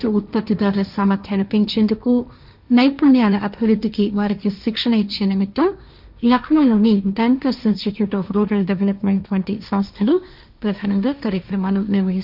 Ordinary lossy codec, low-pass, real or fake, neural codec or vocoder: none; 5.4 kHz; fake; codec, 16 kHz, 1.1 kbps, Voila-Tokenizer